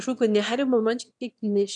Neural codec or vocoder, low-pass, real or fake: autoencoder, 22.05 kHz, a latent of 192 numbers a frame, VITS, trained on one speaker; 9.9 kHz; fake